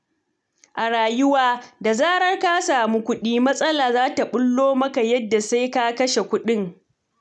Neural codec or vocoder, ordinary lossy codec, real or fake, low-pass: none; none; real; none